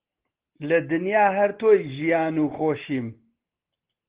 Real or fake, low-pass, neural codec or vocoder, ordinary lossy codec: real; 3.6 kHz; none; Opus, 16 kbps